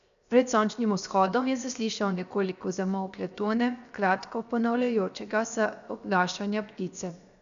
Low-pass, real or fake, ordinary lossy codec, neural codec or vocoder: 7.2 kHz; fake; none; codec, 16 kHz, 0.7 kbps, FocalCodec